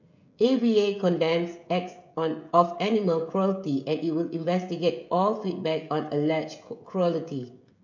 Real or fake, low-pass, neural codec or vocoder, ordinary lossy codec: fake; 7.2 kHz; codec, 16 kHz, 16 kbps, FreqCodec, smaller model; none